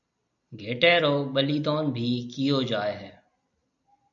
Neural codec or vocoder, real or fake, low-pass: none; real; 7.2 kHz